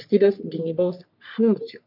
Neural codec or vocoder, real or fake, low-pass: codec, 44.1 kHz, 3.4 kbps, Pupu-Codec; fake; 5.4 kHz